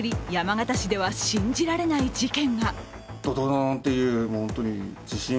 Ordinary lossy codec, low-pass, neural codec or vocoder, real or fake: none; none; none; real